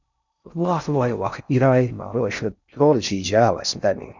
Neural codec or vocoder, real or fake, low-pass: codec, 16 kHz in and 24 kHz out, 0.6 kbps, FocalCodec, streaming, 4096 codes; fake; 7.2 kHz